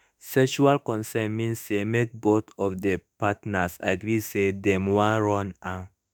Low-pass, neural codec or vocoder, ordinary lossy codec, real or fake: none; autoencoder, 48 kHz, 32 numbers a frame, DAC-VAE, trained on Japanese speech; none; fake